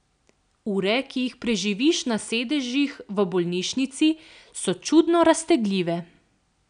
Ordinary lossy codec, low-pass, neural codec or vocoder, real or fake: none; 9.9 kHz; none; real